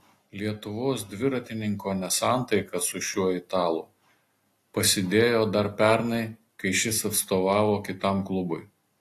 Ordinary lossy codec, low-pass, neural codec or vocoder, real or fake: AAC, 48 kbps; 14.4 kHz; none; real